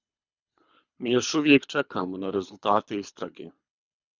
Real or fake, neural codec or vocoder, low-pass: fake; codec, 24 kHz, 3 kbps, HILCodec; 7.2 kHz